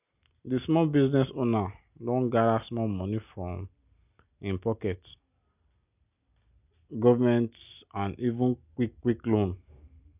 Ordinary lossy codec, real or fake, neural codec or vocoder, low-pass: none; real; none; 3.6 kHz